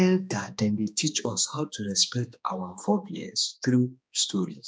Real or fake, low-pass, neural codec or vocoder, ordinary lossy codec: fake; none; codec, 16 kHz, 2 kbps, X-Codec, HuBERT features, trained on balanced general audio; none